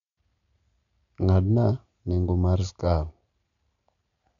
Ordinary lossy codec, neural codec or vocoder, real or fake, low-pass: MP3, 64 kbps; none; real; 7.2 kHz